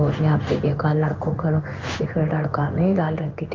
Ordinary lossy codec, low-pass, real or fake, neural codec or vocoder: Opus, 24 kbps; 7.2 kHz; fake; codec, 16 kHz in and 24 kHz out, 1 kbps, XY-Tokenizer